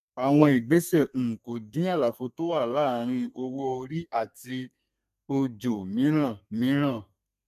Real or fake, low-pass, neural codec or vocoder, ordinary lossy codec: fake; 14.4 kHz; codec, 32 kHz, 1.9 kbps, SNAC; AAC, 96 kbps